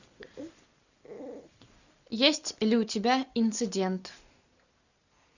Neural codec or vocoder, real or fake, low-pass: none; real; 7.2 kHz